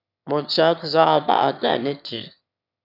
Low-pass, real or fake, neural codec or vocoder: 5.4 kHz; fake; autoencoder, 22.05 kHz, a latent of 192 numbers a frame, VITS, trained on one speaker